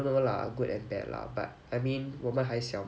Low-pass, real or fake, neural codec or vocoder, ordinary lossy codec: none; real; none; none